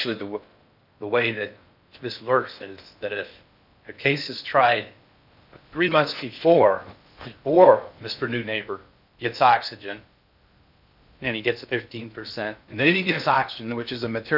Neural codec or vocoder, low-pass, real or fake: codec, 16 kHz in and 24 kHz out, 0.6 kbps, FocalCodec, streaming, 4096 codes; 5.4 kHz; fake